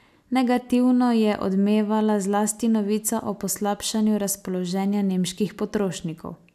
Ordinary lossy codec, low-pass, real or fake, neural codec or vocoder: none; 14.4 kHz; real; none